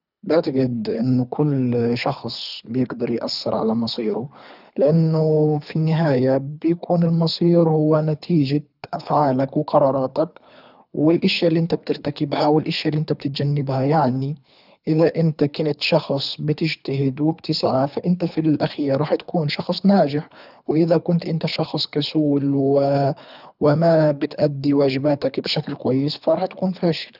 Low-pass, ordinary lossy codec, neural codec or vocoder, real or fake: 5.4 kHz; none; codec, 24 kHz, 3 kbps, HILCodec; fake